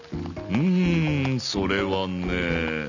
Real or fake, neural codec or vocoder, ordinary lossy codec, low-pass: real; none; none; 7.2 kHz